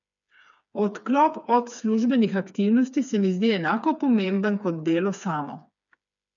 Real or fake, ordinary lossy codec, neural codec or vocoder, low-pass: fake; none; codec, 16 kHz, 4 kbps, FreqCodec, smaller model; 7.2 kHz